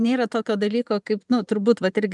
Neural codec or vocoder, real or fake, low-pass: vocoder, 44.1 kHz, 128 mel bands every 512 samples, BigVGAN v2; fake; 10.8 kHz